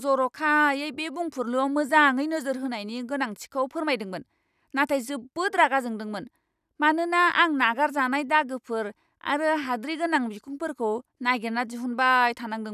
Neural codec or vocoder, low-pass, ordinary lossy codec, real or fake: none; 14.4 kHz; none; real